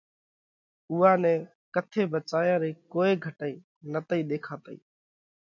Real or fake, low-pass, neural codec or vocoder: real; 7.2 kHz; none